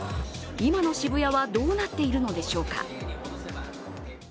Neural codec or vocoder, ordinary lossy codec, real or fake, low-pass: none; none; real; none